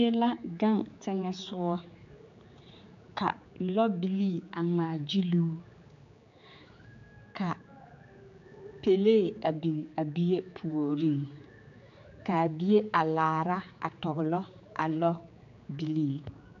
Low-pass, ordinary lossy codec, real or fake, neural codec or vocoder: 7.2 kHz; MP3, 64 kbps; fake; codec, 16 kHz, 4 kbps, X-Codec, HuBERT features, trained on general audio